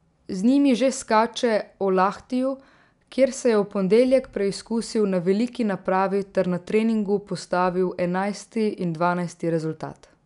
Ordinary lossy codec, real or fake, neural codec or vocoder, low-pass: none; real; none; 10.8 kHz